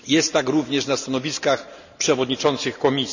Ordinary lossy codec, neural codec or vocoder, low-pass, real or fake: none; none; 7.2 kHz; real